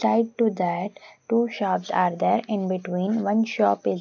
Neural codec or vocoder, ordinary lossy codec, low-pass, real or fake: none; none; 7.2 kHz; real